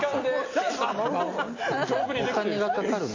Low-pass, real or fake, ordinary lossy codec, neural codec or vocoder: 7.2 kHz; real; AAC, 32 kbps; none